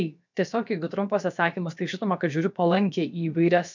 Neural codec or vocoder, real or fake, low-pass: codec, 16 kHz, about 1 kbps, DyCAST, with the encoder's durations; fake; 7.2 kHz